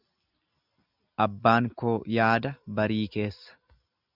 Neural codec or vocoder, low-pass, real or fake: none; 5.4 kHz; real